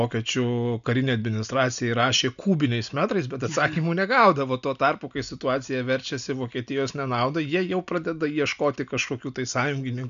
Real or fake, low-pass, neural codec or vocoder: real; 7.2 kHz; none